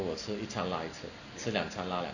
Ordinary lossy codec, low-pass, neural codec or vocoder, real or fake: none; 7.2 kHz; none; real